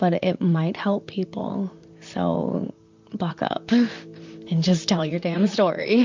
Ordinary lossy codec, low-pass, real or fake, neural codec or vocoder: MP3, 64 kbps; 7.2 kHz; fake; vocoder, 44.1 kHz, 128 mel bands, Pupu-Vocoder